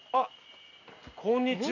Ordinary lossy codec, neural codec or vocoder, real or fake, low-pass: none; none; real; 7.2 kHz